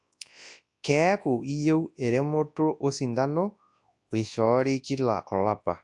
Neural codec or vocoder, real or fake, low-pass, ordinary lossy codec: codec, 24 kHz, 0.9 kbps, WavTokenizer, large speech release; fake; 10.8 kHz; AAC, 64 kbps